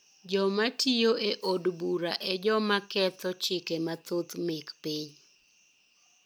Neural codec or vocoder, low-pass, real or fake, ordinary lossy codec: none; none; real; none